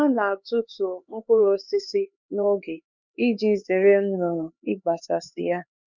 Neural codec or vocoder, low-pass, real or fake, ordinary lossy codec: codec, 16 kHz, 4 kbps, X-Codec, HuBERT features, trained on LibriSpeech; 7.2 kHz; fake; none